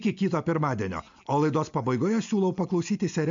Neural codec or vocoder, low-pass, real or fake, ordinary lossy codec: none; 7.2 kHz; real; MP3, 64 kbps